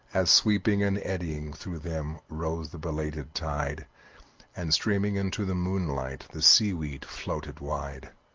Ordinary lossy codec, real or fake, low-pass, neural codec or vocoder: Opus, 24 kbps; real; 7.2 kHz; none